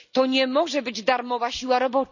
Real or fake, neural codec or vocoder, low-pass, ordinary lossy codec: real; none; 7.2 kHz; none